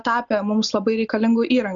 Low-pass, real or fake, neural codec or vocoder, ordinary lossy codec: 7.2 kHz; real; none; MP3, 96 kbps